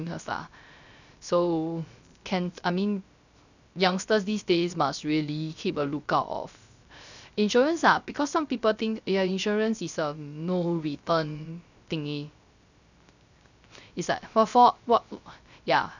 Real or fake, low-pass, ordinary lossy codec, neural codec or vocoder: fake; 7.2 kHz; none; codec, 16 kHz, 0.3 kbps, FocalCodec